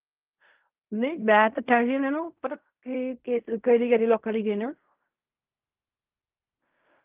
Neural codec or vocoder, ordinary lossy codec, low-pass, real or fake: codec, 16 kHz in and 24 kHz out, 0.4 kbps, LongCat-Audio-Codec, fine tuned four codebook decoder; Opus, 24 kbps; 3.6 kHz; fake